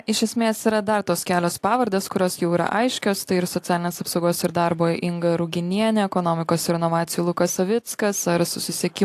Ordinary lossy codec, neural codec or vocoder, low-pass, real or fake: AAC, 64 kbps; none; 14.4 kHz; real